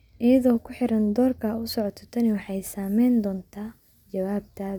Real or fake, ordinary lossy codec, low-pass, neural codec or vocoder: real; none; 19.8 kHz; none